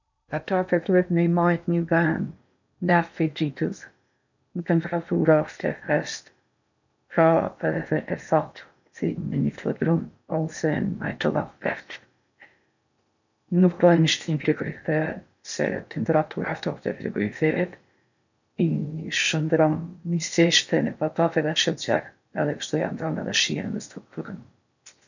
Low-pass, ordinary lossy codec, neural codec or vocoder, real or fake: 7.2 kHz; none; codec, 16 kHz in and 24 kHz out, 0.8 kbps, FocalCodec, streaming, 65536 codes; fake